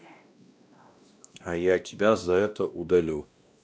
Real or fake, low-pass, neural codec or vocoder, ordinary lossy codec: fake; none; codec, 16 kHz, 1 kbps, X-Codec, WavLM features, trained on Multilingual LibriSpeech; none